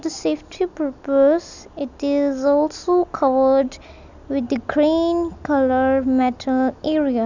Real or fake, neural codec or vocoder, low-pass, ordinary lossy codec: real; none; 7.2 kHz; none